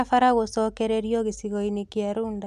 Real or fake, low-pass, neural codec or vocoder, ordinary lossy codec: real; 14.4 kHz; none; none